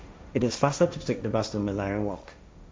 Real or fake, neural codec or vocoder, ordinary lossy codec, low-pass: fake; codec, 16 kHz, 1.1 kbps, Voila-Tokenizer; none; none